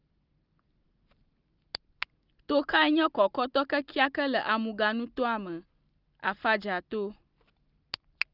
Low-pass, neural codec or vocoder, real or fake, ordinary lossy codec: 5.4 kHz; none; real; Opus, 24 kbps